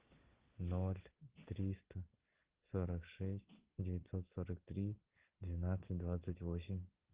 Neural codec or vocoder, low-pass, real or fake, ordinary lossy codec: none; 3.6 kHz; real; Opus, 16 kbps